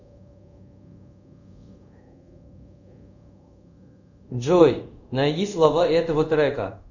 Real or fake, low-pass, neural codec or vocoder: fake; 7.2 kHz; codec, 24 kHz, 0.5 kbps, DualCodec